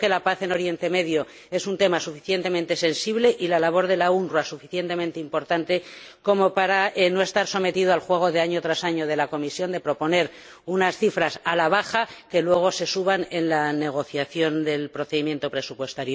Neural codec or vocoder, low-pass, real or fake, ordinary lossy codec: none; none; real; none